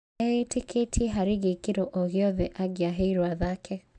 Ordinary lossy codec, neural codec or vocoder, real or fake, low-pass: MP3, 96 kbps; none; real; 9.9 kHz